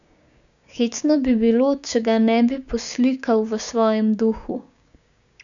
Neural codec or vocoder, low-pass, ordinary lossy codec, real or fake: codec, 16 kHz, 6 kbps, DAC; 7.2 kHz; none; fake